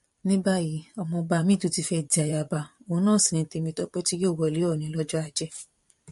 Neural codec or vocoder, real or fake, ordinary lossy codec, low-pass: vocoder, 44.1 kHz, 128 mel bands every 512 samples, BigVGAN v2; fake; MP3, 48 kbps; 14.4 kHz